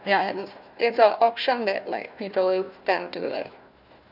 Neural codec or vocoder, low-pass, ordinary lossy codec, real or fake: codec, 16 kHz, 1 kbps, FunCodec, trained on Chinese and English, 50 frames a second; 5.4 kHz; none; fake